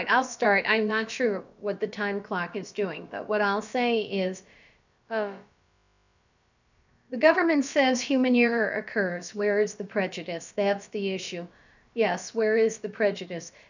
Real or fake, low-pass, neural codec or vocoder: fake; 7.2 kHz; codec, 16 kHz, about 1 kbps, DyCAST, with the encoder's durations